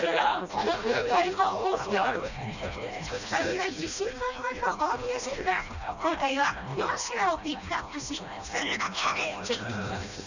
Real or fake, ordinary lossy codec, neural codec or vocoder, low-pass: fake; none; codec, 16 kHz, 1 kbps, FreqCodec, smaller model; 7.2 kHz